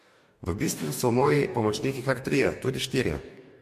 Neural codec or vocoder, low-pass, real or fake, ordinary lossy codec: codec, 44.1 kHz, 2.6 kbps, DAC; 14.4 kHz; fake; MP3, 64 kbps